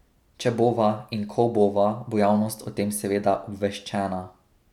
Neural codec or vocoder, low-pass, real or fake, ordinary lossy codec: none; 19.8 kHz; real; none